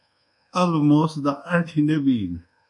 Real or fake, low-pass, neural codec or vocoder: fake; 10.8 kHz; codec, 24 kHz, 1.2 kbps, DualCodec